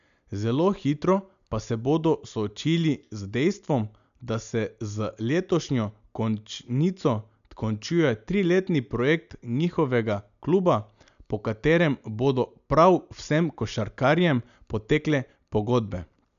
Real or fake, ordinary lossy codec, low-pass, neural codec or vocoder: real; none; 7.2 kHz; none